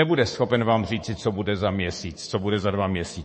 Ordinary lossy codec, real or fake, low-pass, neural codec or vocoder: MP3, 32 kbps; fake; 10.8 kHz; codec, 24 kHz, 3.1 kbps, DualCodec